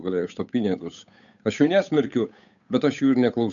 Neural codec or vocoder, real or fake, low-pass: codec, 16 kHz, 8 kbps, FunCodec, trained on Chinese and English, 25 frames a second; fake; 7.2 kHz